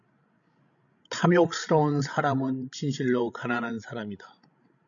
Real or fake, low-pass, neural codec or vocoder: fake; 7.2 kHz; codec, 16 kHz, 16 kbps, FreqCodec, larger model